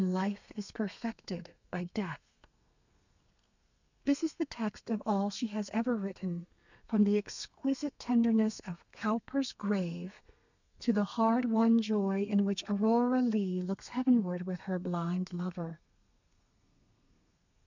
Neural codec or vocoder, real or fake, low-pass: codec, 32 kHz, 1.9 kbps, SNAC; fake; 7.2 kHz